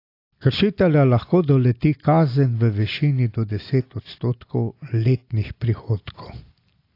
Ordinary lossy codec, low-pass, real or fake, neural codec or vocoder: AAC, 32 kbps; 5.4 kHz; real; none